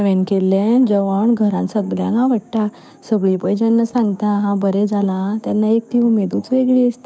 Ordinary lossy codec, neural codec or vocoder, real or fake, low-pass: none; codec, 16 kHz, 6 kbps, DAC; fake; none